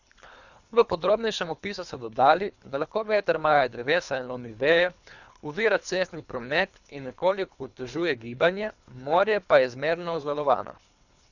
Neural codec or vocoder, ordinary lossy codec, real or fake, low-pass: codec, 24 kHz, 3 kbps, HILCodec; none; fake; 7.2 kHz